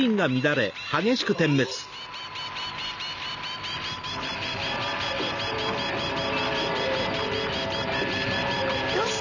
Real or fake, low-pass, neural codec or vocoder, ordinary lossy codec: real; 7.2 kHz; none; none